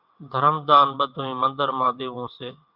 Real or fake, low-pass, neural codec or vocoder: fake; 5.4 kHz; vocoder, 22.05 kHz, 80 mel bands, WaveNeXt